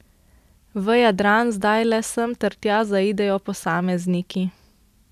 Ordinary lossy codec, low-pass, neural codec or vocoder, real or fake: none; 14.4 kHz; none; real